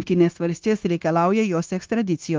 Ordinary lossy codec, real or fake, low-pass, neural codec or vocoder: Opus, 32 kbps; fake; 7.2 kHz; codec, 16 kHz, 0.9 kbps, LongCat-Audio-Codec